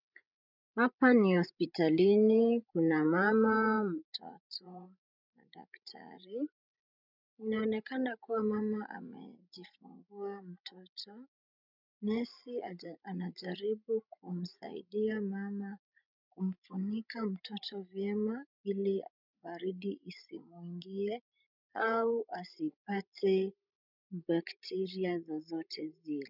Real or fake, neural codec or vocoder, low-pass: fake; codec, 16 kHz, 16 kbps, FreqCodec, larger model; 5.4 kHz